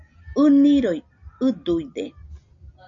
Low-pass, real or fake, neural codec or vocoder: 7.2 kHz; real; none